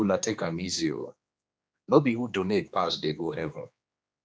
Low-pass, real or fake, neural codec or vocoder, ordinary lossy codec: none; fake; codec, 16 kHz, 2 kbps, X-Codec, HuBERT features, trained on general audio; none